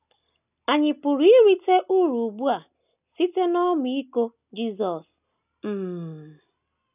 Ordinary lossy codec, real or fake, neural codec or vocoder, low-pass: none; real; none; 3.6 kHz